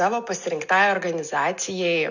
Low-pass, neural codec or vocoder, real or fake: 7.2 kHz; none; real